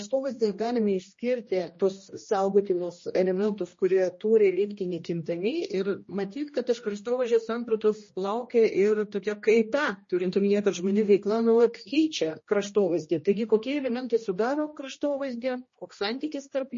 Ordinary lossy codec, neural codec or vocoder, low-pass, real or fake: MP3, 32 kbps; codec, 16 kHz, 1 kbps, X-Codec, HuBERT features, trained on general audio; 7.2 kHz; fake